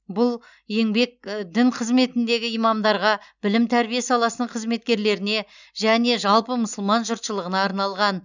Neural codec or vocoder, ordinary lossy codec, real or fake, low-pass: none; none; real; 7.2 kHz